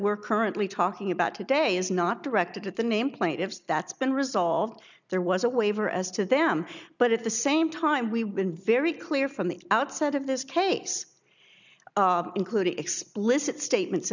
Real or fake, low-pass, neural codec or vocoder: real; 7.2 kHz; none